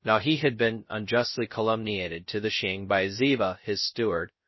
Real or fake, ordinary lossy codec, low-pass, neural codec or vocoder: fake; MP3, 24 kbps; 7.2 kHz; codec, 16 kHz, 0.2 kbps, FocalCodec